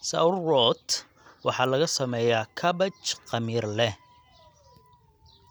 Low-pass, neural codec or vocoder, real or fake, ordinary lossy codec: none; none; real; none